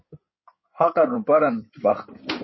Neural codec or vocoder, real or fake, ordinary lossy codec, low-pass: codec, 16 kHz in and 24 kHz out, 2.2 kbps, FireRedTTS-2 codec; fake; MP3, 24 kbps; 7.2 kHz